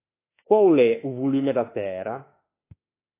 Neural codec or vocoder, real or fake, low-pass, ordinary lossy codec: autoencoder, 48 kHz, 32 numbers a frame, DAC-VAE, trained on Japanese speech; fake; 3.6 kHz; AAC, 24 kbps